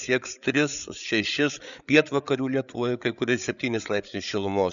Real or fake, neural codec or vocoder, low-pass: fake; codec, 16 kHz, 8 kbps, FreqCodec, larger model; 7.2 kHz